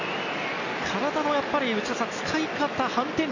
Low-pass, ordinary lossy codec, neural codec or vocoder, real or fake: 7.2 kHz; none; none; real